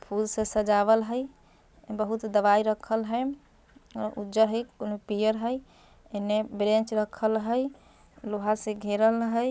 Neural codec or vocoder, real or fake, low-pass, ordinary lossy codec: none; real; none; none